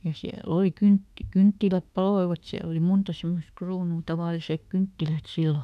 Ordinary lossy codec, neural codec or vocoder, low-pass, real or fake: none; autoencoder, 48 kHz, 32 numbers a frame, DAC-VAE, trained on Japanese speech; 14.4 kHz; fake